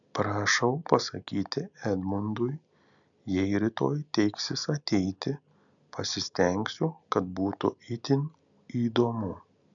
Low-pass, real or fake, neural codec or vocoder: 7.2 kHz; real; none